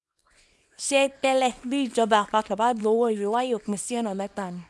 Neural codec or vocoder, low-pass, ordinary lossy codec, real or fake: codec, 24 kHz, 0.9 kbps, WavTokenizer, small release; none; none; fake